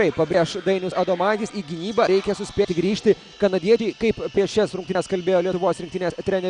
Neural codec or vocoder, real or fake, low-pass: none; real; 9.9 kHz